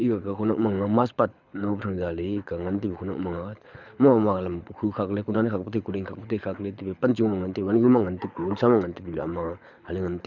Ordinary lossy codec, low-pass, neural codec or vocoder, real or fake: none; 7.2 kHz; codec, 24 kHz, 6 kbps, HILCodec; fake